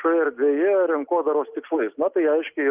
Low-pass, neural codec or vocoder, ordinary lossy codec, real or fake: 3.6 kHz; none; Opus, 32 kbps; real